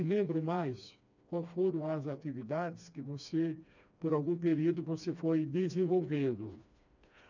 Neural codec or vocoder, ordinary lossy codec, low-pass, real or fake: codec, 16 kHz, 2 kbps, FreqCodec, smaller model; none; 7.2 kHz; fake